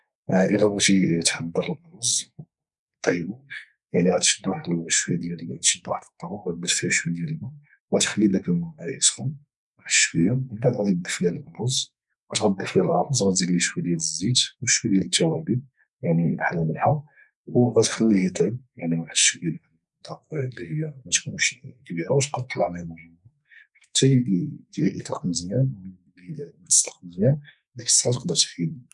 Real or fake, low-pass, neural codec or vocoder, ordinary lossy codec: fake; 10.8 kHz; codec, 44.1 kHz, 2.6 kbps, SNAC; none